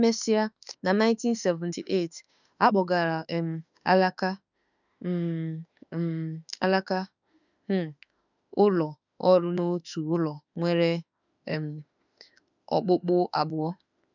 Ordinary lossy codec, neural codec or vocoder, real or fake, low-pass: none; autoencoder, 48 kHz, 32 numbers a frame, DAC-VAE, trained on Japanese speech; fake; 7.2 kHz